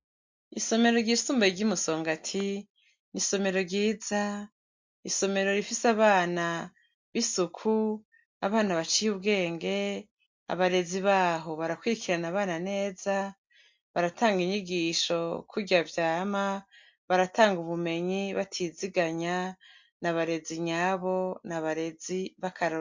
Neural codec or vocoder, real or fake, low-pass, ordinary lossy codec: none; real; 7.2 kHz; MP3, 48 kbps